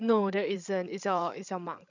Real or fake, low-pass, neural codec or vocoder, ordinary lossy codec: fake; 7.2 kHz; codec, 16 kHz, 8 kbps, FreqCodec, larger model; none